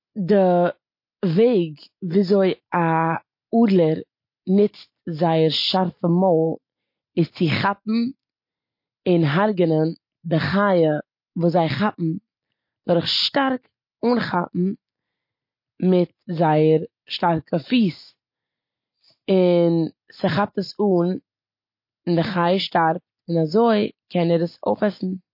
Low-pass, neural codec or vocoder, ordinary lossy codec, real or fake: 5.4 kHz; none; MP3, 32 kbps; real